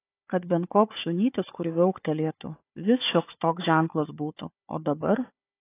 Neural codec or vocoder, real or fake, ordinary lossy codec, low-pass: codec, 16 kHz, 4 kbps, FunCodec, trained on Chinese and English, 50 frames a second; fake; AAC, 24 kbps; 3.6 kHz